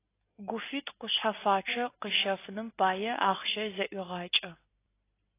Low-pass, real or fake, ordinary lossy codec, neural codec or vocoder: 3.6 kHz; real; AAC, 24 kbps; none